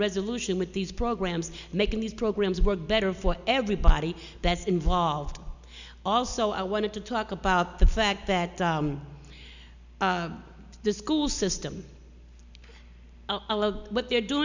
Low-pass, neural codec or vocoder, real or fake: 7.2 kHz; none; real